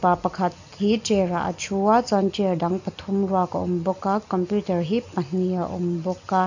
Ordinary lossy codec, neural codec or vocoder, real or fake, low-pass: none; none; real; 7.2 kHz